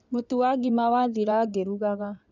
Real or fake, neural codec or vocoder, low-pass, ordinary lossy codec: fake; codec, 16 kHz in and 24 kHz out, 2.2 kbps, FireRedTTS-2 codec; 7.2 kHz; none